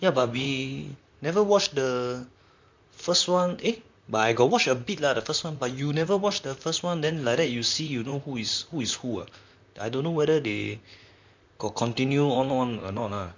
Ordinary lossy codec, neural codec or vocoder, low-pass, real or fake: MP3, 64 kbps; vocoder, 44.1 kHz, 128 mel bands, Pupu-Vocoder; 7.2 kHz; fake